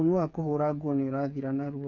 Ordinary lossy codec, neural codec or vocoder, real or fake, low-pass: none; codec, 16 kHz, 8 kbps, FreqCodec, smaller model; fake; 7.2 kHz